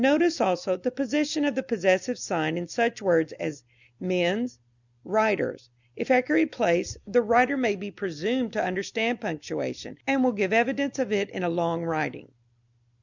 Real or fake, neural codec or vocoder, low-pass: real; none; 7.2 kHz